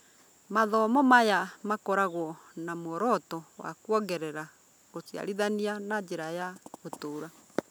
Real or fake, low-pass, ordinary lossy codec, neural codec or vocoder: real; none; none; none